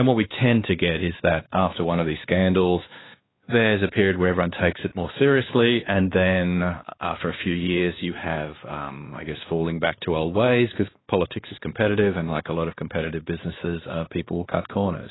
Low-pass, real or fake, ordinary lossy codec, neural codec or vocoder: 7.2 kHz; fake; AAC, 16 kbps; codec, 16 kHz, 2 kbps, X-Codec, WavLM features, trained on Multilingual LibriSpeech